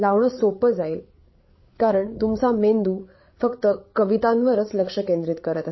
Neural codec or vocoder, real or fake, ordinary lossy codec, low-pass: codec, 16 kHz, 8 kbps, FreqCodec, larger model; fake; MP3, 24 kbps; 7.2 kHz